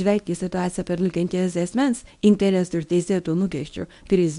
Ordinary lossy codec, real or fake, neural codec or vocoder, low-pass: MP3, 64 kbps; fake; codec, 24 kHz, 0.9 kbps, WavTokenizer, medium speech release version 1; 10.8 kHz